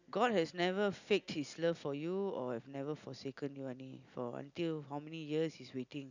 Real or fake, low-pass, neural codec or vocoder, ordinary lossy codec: real; 7.2 kHz; none; none